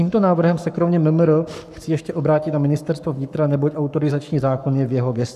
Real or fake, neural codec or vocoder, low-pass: fake; codec, 44.1 kHz, 7.8 kbps, Pupu-Codec; 14.4 kHz